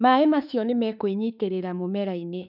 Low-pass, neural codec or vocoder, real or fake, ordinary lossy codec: 5.4 kHz; autoencoder, 48 kHz, 32 numbers a frame, DAC-VAE, trained on Japanese speech; fake; none